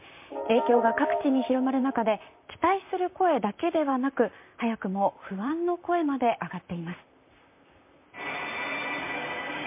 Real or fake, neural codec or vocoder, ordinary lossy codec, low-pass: fake; vocoder, 44.1 kHz, 128 mel bands, Pupu-Vocoder; MP3, 24 kbps; 3.6 kHz